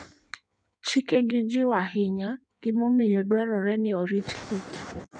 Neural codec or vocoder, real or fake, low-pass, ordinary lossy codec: codec, 16 kHz in and 24 kHz out, 1.1 kbps, FireRedTTS-2 codec; fake; 9.9 kHz; none